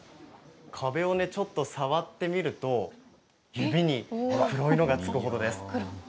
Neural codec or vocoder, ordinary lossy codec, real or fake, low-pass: none; none; real; none